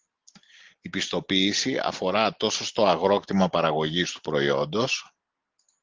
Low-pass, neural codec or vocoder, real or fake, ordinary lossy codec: 7.2 kHz; none; real; Opus, 16 kbps